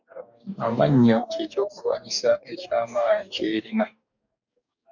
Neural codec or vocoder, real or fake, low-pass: codec, 44.1 kHz, 2.6 kbps, DAC; fake; 7.2 kHz